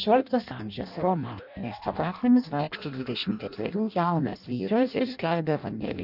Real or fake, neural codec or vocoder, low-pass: fake; codec, 16 kHz in and 24 kHz out, 0.6 kbps, FireRedTTS-2 codec; 5.4 kHz